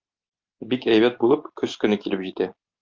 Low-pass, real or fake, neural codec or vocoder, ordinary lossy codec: 7.2 kHz; real; none; Opus, 24 kbps